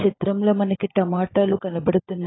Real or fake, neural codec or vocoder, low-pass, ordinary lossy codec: fake; codec, 16 kHz, 16 kbps, FunCodec, trained on LibriTTS, 50 frames a second; 7.2 kHz; AAC, 16 kbps